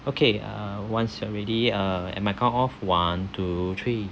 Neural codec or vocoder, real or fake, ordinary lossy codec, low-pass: none; real; none; none